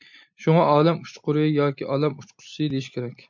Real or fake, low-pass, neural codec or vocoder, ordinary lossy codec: real; 7.2 kHz; none; MP3, 48 kbps